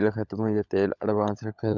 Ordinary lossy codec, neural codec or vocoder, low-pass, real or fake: none; codec, 16 kHz, 16 kbps, FunCodec, trained on LibriTTS, 50 frames a second; 7.2 kHz; fake